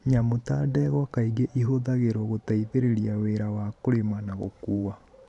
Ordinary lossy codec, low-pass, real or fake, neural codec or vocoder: none; 10.8 kHz; fake; vocoder, 44.1 kHz, 128 mel bands every 512 samples, BigVGAN v2